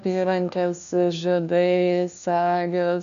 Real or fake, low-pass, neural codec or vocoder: fake; 7.2 kHz; codec, 16 kHz, 1 kbps, FunCodec, trained on LibriTTS, 50 frames a second